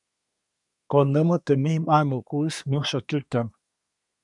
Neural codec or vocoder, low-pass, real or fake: codec, 24 kHz, 1 kbps, SNAC; 10.8 kHz; fake